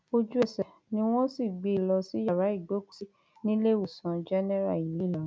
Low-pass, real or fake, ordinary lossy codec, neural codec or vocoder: none; real; none; none